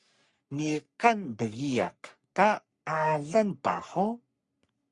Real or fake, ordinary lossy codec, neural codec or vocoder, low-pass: fake; Opus, 64 kbps; codec, 44.1 kHz, 3.4 kbps, Pupu-Codec; 10.8 kHz